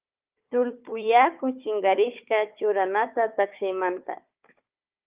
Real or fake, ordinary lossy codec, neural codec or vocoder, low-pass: fake; Opus, 24 kbps; codec, 16 kHz, 4 kbps, FunCodec, trained on Chinese and English, 50 frames a second; 3.6 kHz